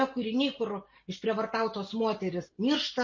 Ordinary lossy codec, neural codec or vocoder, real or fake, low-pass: MP3, 32 kbps; none; real; 7.2 kHz